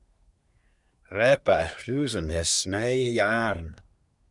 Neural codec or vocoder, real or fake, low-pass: codec, 24 kHz, 1 kbps, SNAC; fake; 10.8 kHz